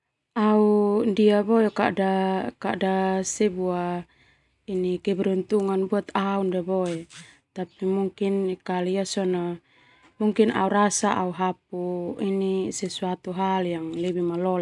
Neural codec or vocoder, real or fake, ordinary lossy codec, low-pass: none; real; none; 10.8 kHz